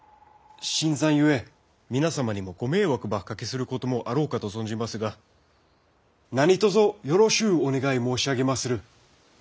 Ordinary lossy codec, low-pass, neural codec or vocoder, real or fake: none; none; none; real